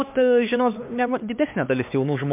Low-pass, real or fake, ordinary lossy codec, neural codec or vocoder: 3.6 kHz; fake; MP3, 32 kbps; codec, 16 kHz, 2 kbps, X-Codec, HuBERT features, trained on LibriSpeech